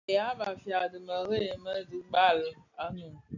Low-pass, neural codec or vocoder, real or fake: 7.2 kHz; none; real